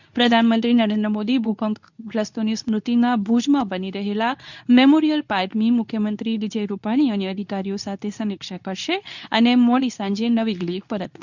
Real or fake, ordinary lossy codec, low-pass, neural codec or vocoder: fake; none; 7.2 kHz; codec, 24 kHz, 0.9 kbps, WavTokenizer, medium speech release version 2